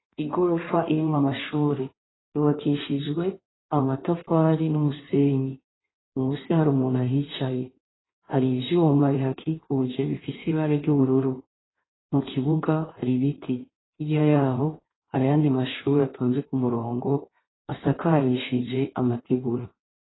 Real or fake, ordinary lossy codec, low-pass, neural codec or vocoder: fake; AAC, 16 kbps; 7.2 kHz; codec, 16 kHz in and 24 kHz out, 1.1 kbps, FireRedTTS-2 codec